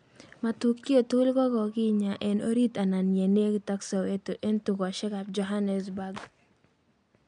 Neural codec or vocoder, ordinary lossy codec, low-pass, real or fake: none; MP3, 64 kbps; 9.9 kHz; real